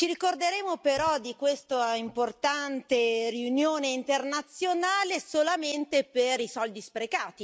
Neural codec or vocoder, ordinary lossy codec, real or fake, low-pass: none; none; real; none